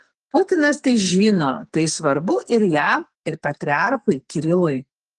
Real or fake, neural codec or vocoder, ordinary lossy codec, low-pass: fake; codec, 44.1 kHz, 2.6 kbps, SNAC; Opus, 24 kbps; 10.8 kHz